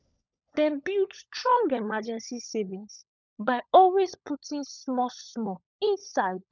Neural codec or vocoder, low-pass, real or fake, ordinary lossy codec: codec, 16 kHz, 16 kbps, FunCodec, trained on LibriTTS, 50 frames a second; 7.2 kHz; fake; none